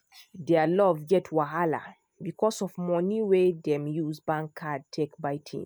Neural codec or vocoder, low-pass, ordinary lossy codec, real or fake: none; none; none; real